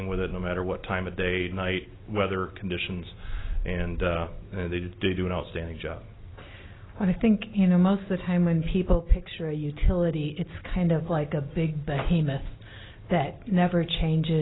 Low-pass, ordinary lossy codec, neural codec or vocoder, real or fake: 7.2 kHz; AAC, 16 kbps; none; real